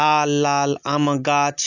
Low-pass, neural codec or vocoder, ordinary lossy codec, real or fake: 7.2 kHz; none; none; real